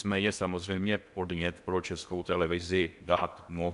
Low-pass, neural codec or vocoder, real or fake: 10.8 kHz; codec, 16 kHz in and 24 kHz out, 0.8 kbps, FocalCodec, streaming, 65536 codes; fake